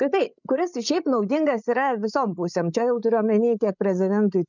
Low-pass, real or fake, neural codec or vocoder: 7.2 kHz; fake; codec, 16 kHz, 8 kbps, FreqCodec, larger model